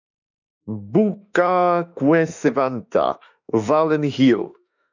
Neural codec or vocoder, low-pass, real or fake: autoencoder, 48 kHz, 32 numbers a frame, DAC-VAE, trained on Japanese speech; 7.2 kHz; fake